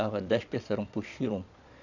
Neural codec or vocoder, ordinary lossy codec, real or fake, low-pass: vocoder, 22.05 kHz, 80 mel bands, Vocos; none; fake; 7.2 kHz